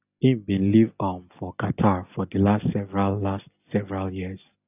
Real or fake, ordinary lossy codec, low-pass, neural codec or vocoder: real; none; 3.6 kHz; none